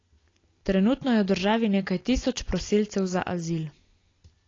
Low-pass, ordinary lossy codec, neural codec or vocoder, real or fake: 7.2 kHz; AAC, 32 kbps; none; real